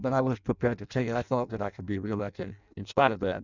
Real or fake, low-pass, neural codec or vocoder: fake; 7.2 kHz; codec, 16 kHz in and 24 kHz out, 0.6 kbps, FireRedTTS-2 codec